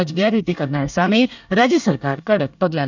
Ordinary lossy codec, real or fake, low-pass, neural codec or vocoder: none; fake; 7.2 kHz; codec, 24 kHz, 1 kbps, SNAC